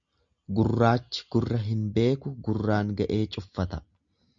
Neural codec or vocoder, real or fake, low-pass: none; real; 7.2 kHz